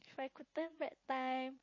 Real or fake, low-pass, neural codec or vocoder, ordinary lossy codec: fake; 7.2 kHz; vocoder, 44.1 kHz, 80 mel bands, Vocos; MP3, 32 kbps